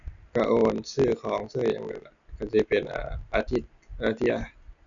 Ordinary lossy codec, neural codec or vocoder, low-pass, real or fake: none; none; 7.2 kHz; real